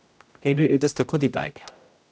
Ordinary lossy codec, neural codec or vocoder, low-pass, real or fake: none; codec, 16 kHz, 0.5 kbps, X-Codec, HuBERT features, trained on general audio; none; fake